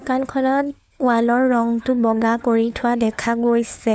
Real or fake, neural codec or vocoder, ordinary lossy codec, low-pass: fake; codec, 16 kHz, 4 kbps, FunCodec, trained on LibriTTS, 50 frames a second; none; none